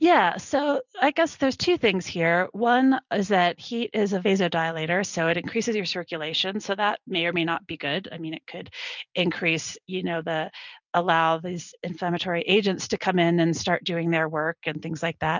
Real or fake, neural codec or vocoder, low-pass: real; none; 7.2 kHz